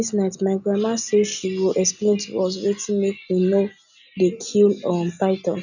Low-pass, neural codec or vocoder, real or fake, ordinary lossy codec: 7.2 kHz; none; real; none